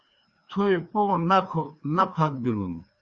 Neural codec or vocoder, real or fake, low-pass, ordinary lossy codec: codec, 16 kHz, 2 kbps, FreqCodec, larger model; fake; 7.2 kHz; Opus, 64 kbps